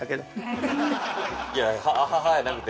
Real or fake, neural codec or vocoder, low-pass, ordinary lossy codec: real; none; none; none